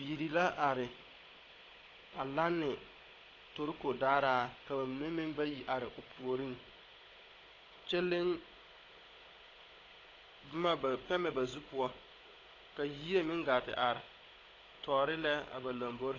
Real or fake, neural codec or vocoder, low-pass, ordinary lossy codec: fake; codec, 16 kHz, 16 kbps, FunCodec, trained on Chinese and English, 50 frames a second; 7.2 kHz; AAC, 32 kbps